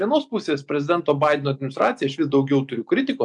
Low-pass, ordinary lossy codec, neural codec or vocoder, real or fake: 10.8 kHz; MP3, 96 kbps; none; real